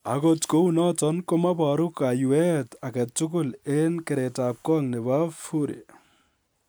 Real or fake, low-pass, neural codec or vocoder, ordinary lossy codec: real; none; none; none